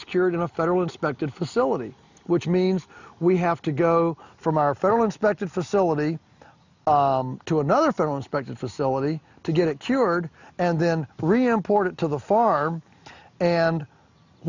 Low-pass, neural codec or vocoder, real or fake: 7.2 kHz; none; real